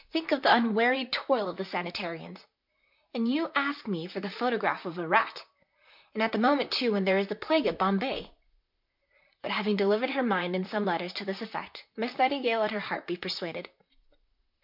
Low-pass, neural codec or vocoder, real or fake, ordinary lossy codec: 5.4 kHz; vocoder, 44.1 kHz, 128 mel bands, Pupu-Vocoder; fake; MP3, 48 kbps